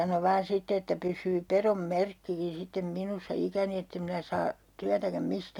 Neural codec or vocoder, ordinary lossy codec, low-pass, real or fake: none; none; 19.8 kHz; real